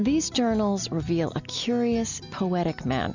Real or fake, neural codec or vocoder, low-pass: real; none; 7.2 kHz